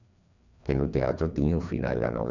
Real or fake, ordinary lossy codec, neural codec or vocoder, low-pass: fake; none; codec, 16 kHz, 2 kbps, FreqCodec, larger model; 7.2 kHz